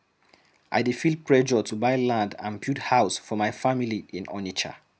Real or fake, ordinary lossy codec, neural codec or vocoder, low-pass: real; none; none; none